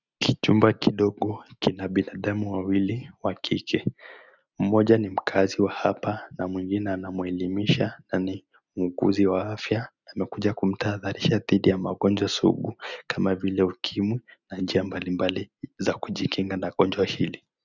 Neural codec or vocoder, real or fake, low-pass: none; real; 7.2 kHz